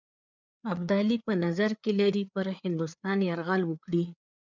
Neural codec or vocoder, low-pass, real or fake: codec, 16 kHz, 4 kbps, FreqCodec, larger model; 7.2 kHz; fake